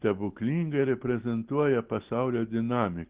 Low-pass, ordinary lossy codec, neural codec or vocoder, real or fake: 3.6 kHz; Opus, 16 kbps; none; real